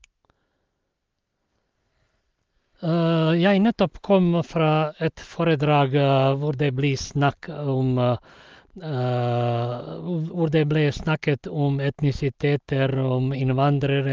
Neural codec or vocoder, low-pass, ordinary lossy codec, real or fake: none; 7.2 kHz; Opus, 24 kbps; real